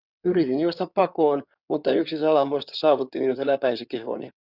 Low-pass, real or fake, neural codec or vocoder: 5.4 kHz; fake; codec, 16 kHz in and 24 kHz out, 2.2 kbps, FireRedTTS-2 codec